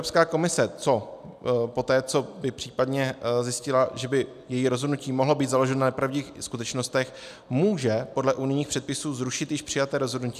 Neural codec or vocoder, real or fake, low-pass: none; real; 14.4 kHz